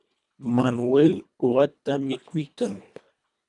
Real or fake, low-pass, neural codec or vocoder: fake; 10.8 kHz; codec, 24 kHz, 1.5 kbps, HILCodec